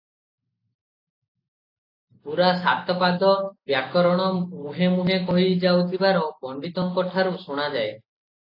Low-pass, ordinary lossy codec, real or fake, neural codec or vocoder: 5.4 kHz; AAC, 32 kbps; real; none